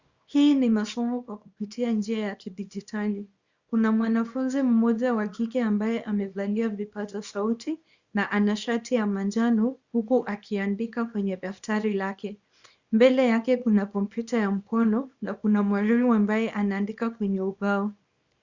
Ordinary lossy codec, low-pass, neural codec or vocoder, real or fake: Opus, 64 kbps; 7.2 kHz; codec, 24 kHz, 0.9 kbps, WavTokenizer, small release; fake